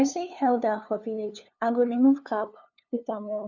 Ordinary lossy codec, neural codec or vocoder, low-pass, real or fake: none; codec, 16 kHz, 2 kbps, FunCodec, trained on LibriTTS, 25 frames a second; 7.2 kHz; fake